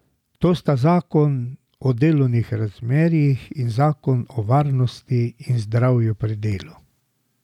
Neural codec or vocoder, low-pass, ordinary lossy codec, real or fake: none; 19.8 kHz; none; real